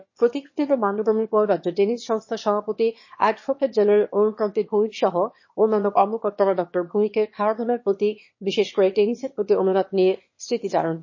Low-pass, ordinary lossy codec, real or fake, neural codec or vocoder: 7.2 kHz; MP3, 32 kbps; fake; autoencoder, 22.05 kHz, a latent of 192 numbers a frame, VITS, trained on one speaker